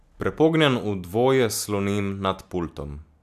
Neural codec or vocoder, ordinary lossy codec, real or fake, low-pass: none; none; real; 14.4 kHz